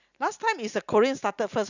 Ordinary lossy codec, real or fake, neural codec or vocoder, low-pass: none; real; none; 7.2 kHz